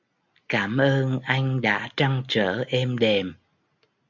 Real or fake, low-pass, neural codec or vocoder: real; 7.2 kHz; none